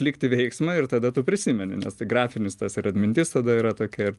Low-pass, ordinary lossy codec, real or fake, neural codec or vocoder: 10.8 kHz; Opus, 32 kbps; real; none